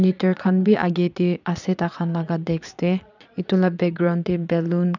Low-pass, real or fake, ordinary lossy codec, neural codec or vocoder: 7.2 kHz; fake; none; vocoder, 22.05 kHz, 80 mel bands, Vocos